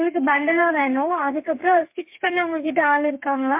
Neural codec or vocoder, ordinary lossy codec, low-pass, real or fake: codec, 32 kHz, 1.9 kbps, SNAC; MP3, 32 kbps; 3.6 kHz; fake